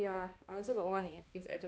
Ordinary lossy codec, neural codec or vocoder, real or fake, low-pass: none; codec, 16 kHz, 1 kbps, X-Codec, HuBERT features, trained on balanced general audio; fake; none